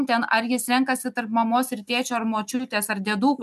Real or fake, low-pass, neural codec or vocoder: real; 14.4 kHz; none